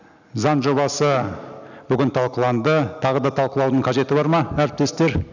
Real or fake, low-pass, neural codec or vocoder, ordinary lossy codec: real; 7.2 kHz; none; none